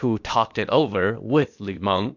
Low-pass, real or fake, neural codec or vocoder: 7.2 kHz; fake; codec, 16 kHz, 0.8 kbps, ZipCodec